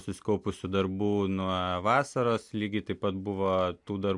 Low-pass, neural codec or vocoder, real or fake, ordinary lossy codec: 10.8 kHz; none; real; MP3, 64 kbps